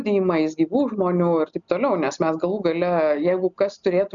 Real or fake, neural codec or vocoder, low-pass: real; none; 7.2 kHz